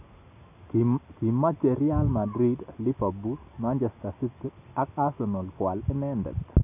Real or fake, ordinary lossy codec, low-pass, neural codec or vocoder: real; none; 3.6 kHz; none